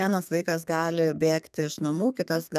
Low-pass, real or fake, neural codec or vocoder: 14.4 kHz; fake; codec, 32 kHz, 1.9 kbps, SNAC